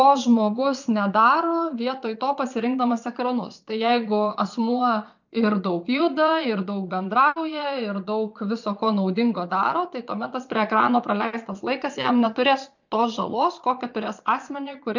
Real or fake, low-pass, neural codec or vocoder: fake; 7.2 kHz; vocoder, 22.05 kHz, 80 mel bands, Vocos